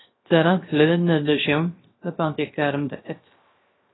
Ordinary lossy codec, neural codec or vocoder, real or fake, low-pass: AAC, 16 kbps; codec, 16 kHz, 0.3 kbps, FocalCodec; fake; 7.2 kHz